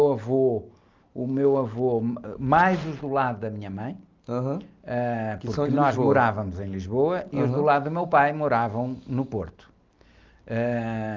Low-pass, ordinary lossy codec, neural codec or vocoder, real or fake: 7.2 kHz; Opus, 24 kbps; none; real